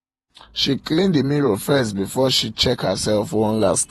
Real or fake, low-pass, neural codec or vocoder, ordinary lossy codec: fake; 19.8 kHz; vocoder, 48 kHz, 128 mel bands, Vocos; AAC, 32 kbps